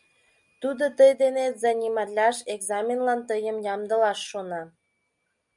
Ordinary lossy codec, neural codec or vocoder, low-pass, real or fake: MP3, 96 kbps; none; 10.8 kHz; real